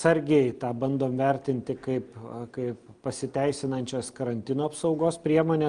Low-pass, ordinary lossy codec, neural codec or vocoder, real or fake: 9.9 kHz; Opus, 32 kbps; none; real